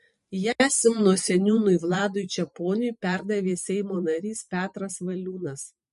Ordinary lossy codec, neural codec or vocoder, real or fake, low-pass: MP3, 48 kbps; vocoder, 44.1 kHz, 128 mel bands every 512 samples, BigVGAN v2; fake; 14.4 kHz